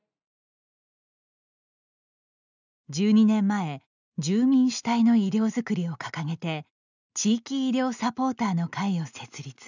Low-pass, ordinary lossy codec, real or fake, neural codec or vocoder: 7.2 kHz; none; real; none